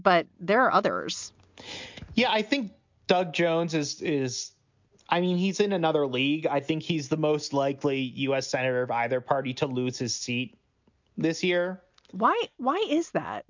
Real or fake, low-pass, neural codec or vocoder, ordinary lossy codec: real; 7.2 kHz; none; MP3, 64 kbps